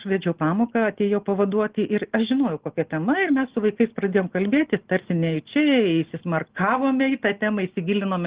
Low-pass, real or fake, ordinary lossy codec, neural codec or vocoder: 3.6 kHz; real; Opus, 16 kbps; none